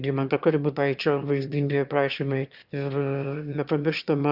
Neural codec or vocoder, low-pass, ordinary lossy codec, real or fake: autoencoder, 22.05 kHz, a latent of 192 numbers a frame, VITS, trained on one speaker; 5.4 kHz; Opus, 64 kbps; fake